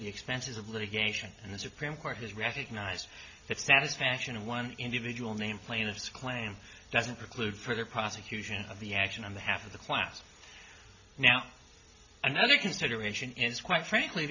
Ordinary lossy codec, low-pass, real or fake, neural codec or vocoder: MP3, 48 kbps; 7.2 kHz; real; none